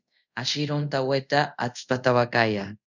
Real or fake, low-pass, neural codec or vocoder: fake; 7.2 kHz; codec, 24 kHz, 0.5 kbps, DualCodec